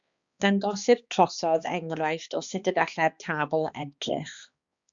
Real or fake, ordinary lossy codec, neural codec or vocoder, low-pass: fake; Opus, 64 kbps; codec, 16 kHz, 2 kbps, X-Codec, HuBERT features, trained on balanced general audio; 7.2 kHz